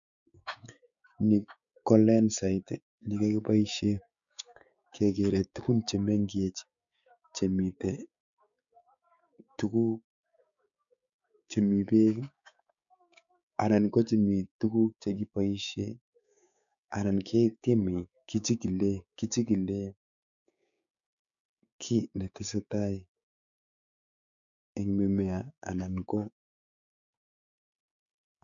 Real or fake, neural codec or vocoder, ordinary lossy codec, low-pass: fake; codec, 16 kHz, 6 kbps, DAC; none; 7.2 kHz